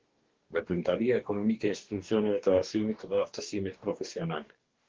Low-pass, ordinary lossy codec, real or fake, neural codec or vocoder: 7.2 kHz; Opus, 16 kbps; fake; codec, 44.1 kHz, 2.6 kbps, DAC